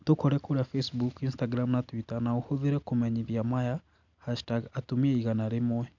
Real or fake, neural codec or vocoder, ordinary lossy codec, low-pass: real; none; none; 7.2 kHz